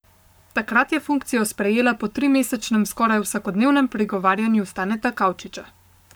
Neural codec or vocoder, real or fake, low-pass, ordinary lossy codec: codec, 44.1 kHz, 7.8 kbps, Pupu-Codec; fake; none; none